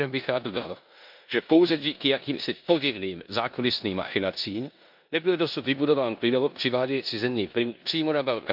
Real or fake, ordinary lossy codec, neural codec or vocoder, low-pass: fake; MP3, 48 kbps; codec, 16 kHz in and 24 kHz out, 0.9 kbps, LongCat-Audio-Codec, four codebook decoder; 5.4 kHz